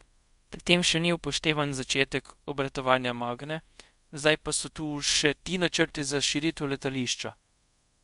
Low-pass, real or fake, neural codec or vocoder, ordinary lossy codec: 10.8 kHz; fake; codec, 24 kHz, 0.5 kbps, DualCodec; MP3, 64 kbps